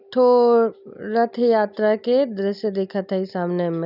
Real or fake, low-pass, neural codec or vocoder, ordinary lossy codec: real; 5.4 kHz; none; none